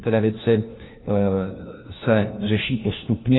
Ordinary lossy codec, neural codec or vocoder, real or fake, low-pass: AAC, 16 kbps; codec, 16 kHz, 1 kbps, FunCodec, trained on LibriTTS, 50 frames a second; fake; 7.2 kHz